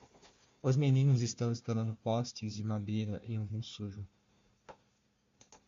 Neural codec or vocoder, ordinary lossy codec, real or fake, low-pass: codec, 16 kHz, 1 kbps, FunCodec, trained on Chinese and English, 50 frames a second; MP3, 48 kbps; fake; 7.2 kHz